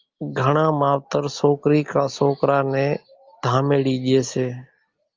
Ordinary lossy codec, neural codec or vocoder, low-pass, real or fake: Opus, 24 kbps; none; 7.2 kHz; real